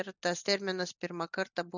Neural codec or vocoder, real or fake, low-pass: none; real; 7.2 kHz